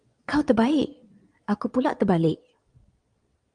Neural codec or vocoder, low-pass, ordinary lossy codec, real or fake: vocoder, 22.05 kHz, 80 mel bands, Vocos; 9.9 kHz; Opus, 32 kbps; fake